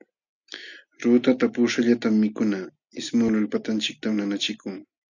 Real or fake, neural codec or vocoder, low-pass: real; none; 7.2 kHz